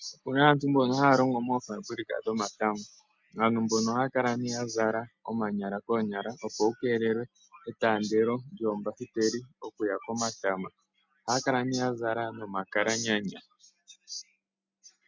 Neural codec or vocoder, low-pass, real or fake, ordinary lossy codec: none; 7.2 kHz; real; MP3, 64 kbps